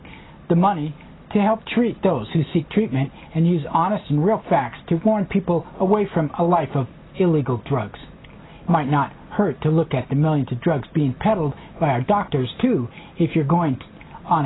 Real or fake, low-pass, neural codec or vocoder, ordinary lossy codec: real; 7.2 kHz; none; AAC, 16 kbps